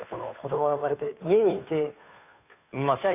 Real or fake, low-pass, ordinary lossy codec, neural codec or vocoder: fake; 3.6 kHz; none; codec, 16 kHz, 2 kbps, FunCodec, trained on Chinese and English, 25 frames a second